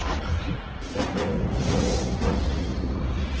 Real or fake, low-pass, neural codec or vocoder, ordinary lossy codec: fake; 7.2 kHz; vocoder, 44.1 kHz, 80 mel bands, Vocos; Opus, 16 kbps